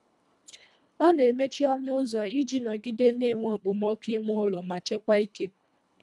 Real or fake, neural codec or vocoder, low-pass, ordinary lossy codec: fake; codec, 24 kHz, 1.5 kbps, HILCodec; none; none